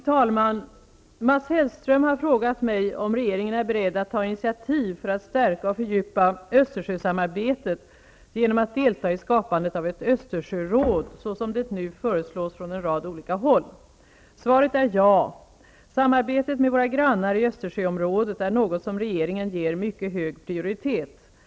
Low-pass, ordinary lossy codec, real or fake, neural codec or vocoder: none; none; real; none